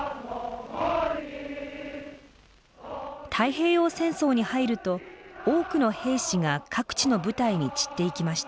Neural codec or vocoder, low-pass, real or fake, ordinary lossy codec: none; none; real; none